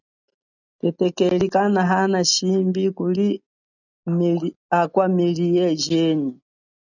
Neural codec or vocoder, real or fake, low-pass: none; real; 7.2 kHz